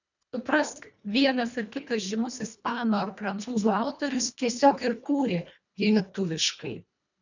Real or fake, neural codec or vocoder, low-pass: fake; codec, 24 kHz, 1.5 kbps, HILCodec; 7.2 kHz